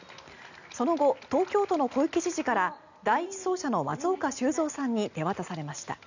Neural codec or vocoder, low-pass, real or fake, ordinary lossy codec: none; 7.2 kHz; real; none